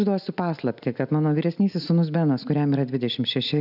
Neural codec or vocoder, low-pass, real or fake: none; 5.4 kHz; real